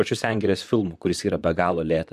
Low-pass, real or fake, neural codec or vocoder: 14.4 kHz; fake; vocoder, 44.1 kHz, 128 mel bands, Pupu-Vocoder